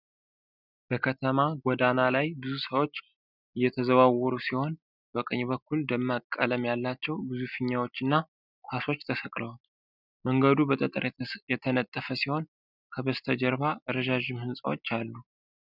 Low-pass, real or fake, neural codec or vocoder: 5.4 kHz; real; none